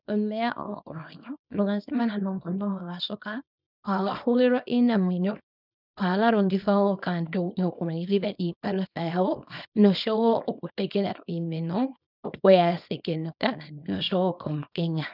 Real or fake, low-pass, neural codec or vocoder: fake; 5.4 kHz; codec, 24 kHz, 0.9 kbps, WavTokenizer, small release